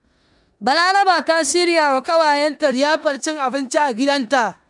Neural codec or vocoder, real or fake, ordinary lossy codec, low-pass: codec, 16 kHz in and 24 kHz out, 0.9 kbps, LongCat-Audio-Codec, four codebook decoder; fake; none; 10.8 kHz